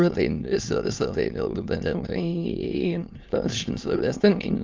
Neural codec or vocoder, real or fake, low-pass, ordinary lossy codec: autoencoder, 22.05 kHz, a latent of 192 numbers a frame, VITS, trained on many speakers; fake; 7.2 kHz; Opus, 24 kbps